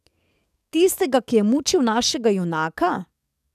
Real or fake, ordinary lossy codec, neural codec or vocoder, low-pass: fake; none; codec, 44.1 kHz, 7.8 kbps, DAC; 14.4 kHz